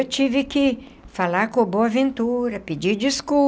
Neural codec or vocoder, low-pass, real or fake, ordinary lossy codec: none; none; real; none